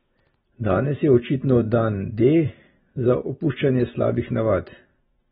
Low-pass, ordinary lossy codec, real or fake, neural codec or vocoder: 19.8 kHz; AAC, 16 kbps; real; none